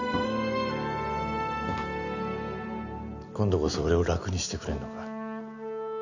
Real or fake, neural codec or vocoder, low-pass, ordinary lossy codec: real; none; 7.2 kHz; none